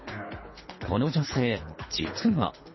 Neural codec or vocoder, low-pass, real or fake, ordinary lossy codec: codec, 24 kHz, 3 kbps, HILCodec; 7.2 kHz; fake; MP3, 24 kbps